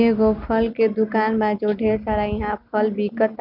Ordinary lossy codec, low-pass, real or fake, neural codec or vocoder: none; 5.4 kHz; real; none